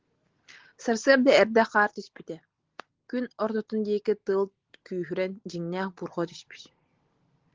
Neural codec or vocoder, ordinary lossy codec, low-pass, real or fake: none; Opus, 16 kbps; 7.2 kHz; real